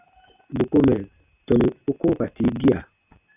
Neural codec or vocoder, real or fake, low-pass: none; real; 3.6 kHz